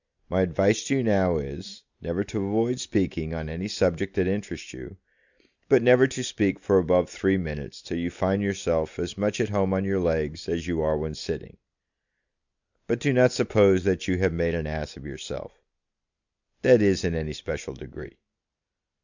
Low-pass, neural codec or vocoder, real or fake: 7.2 kHz; none; real